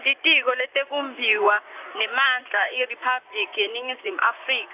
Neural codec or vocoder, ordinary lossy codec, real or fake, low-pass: vocoder, 44.1 kHz, 128 mel bands, Pupu-Vocoder; AAC, 32 kbps; fake; 3.6 kHz